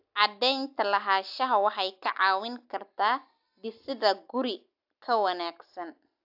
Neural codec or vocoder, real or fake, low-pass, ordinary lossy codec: none; real; 5.4 kHz; AAC, 48 kbps